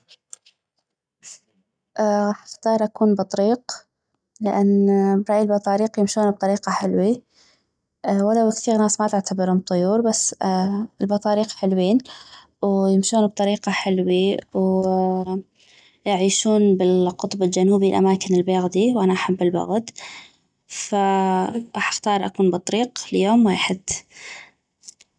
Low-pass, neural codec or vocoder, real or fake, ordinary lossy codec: 9.9 kHz; none; real; none